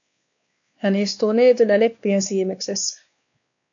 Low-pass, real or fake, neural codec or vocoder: 7.2 kHz; fake; codec, 16 kHz, 2 kbps, X-Codec, WavLM features, trained on Multilingual LibriSpeech